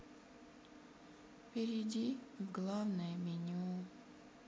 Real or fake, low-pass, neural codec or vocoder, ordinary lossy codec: real; none; none; none